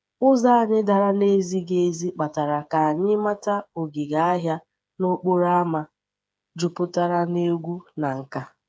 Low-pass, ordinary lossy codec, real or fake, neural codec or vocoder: none; none; fake; codec, 16 kHz, 8 kbps, FreqCodec, smaller model